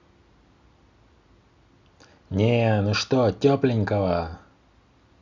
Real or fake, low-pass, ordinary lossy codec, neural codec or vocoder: real; 7.2 kHz; Opus, 64 kbps; none